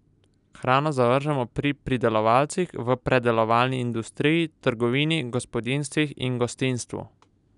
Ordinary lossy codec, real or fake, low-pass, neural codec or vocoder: none; real; 10.8 kHz; none